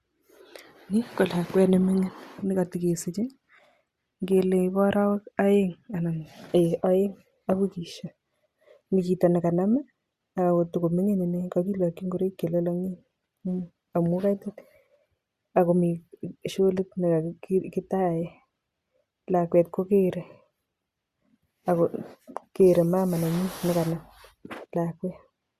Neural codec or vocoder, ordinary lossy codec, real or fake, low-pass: none; Opus, 64 kbps; real; 14.4 kHz